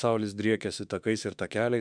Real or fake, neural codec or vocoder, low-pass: fake; autoencoder, 48 kHz, 32 numbers a frame, DAC-VAE, trained on Japanese speech; 9.9 kHz